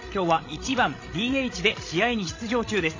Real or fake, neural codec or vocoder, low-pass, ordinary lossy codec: fake; codec, 16 kHz, 16 kbps, FreqCodec, larger model; 7.2 kHz; AAC, 32 kbps